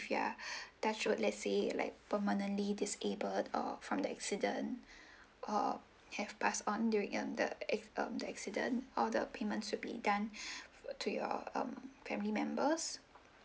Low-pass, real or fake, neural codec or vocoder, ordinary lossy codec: none; real; none; none